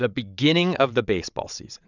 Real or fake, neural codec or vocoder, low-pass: fake; vocoder, 22.05 kHz, 80 mel bands, WaveNeXt; 7.2 kHz